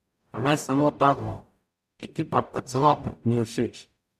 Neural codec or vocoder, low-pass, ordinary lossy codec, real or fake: codec, 44.1 kHz, 0.9 kbps, DAC; 14.4 kHz; none; fake